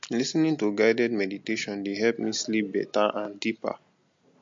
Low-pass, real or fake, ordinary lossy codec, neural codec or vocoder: 7.2 kHz; real; MP3, 48 kbps; none